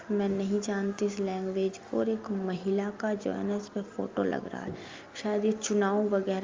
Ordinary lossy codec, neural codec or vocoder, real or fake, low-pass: Opus, 32 kbps; none; real; 7.2 kHz